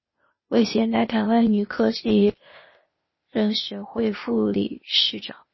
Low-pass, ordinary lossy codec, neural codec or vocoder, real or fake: 7.2 kHz; MP3, 24 kbps; codec, 16 kHz, 0.8 kbps, ZipCodec; fake